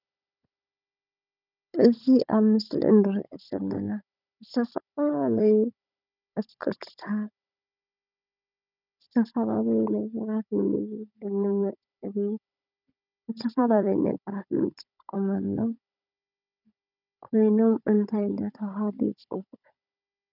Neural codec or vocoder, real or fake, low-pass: codec, 16 kHz, 16 kbps, FunCodec, trained on Chinese and English, 50 frames a second; fake; 5.4 kHz